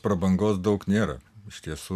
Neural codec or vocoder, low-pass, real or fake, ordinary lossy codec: none; 14.4 kHz; real; AAC, 96 kbps